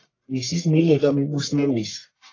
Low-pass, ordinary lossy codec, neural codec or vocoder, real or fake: 7.2 kHz; AAC, 32 kbps; codec, 44.1 kHz, 1.7 kbps, Pupu-Codec; fake